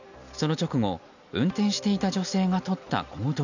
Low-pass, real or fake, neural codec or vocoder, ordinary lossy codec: 7.2 kHz; real; none; none